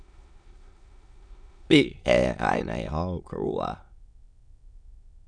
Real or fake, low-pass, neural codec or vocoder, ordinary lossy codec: fake; 9.9 kHz; autoencoder, 22.05 kHz, a latent of 192 numbers a frame, VITS, trained on many speakers; none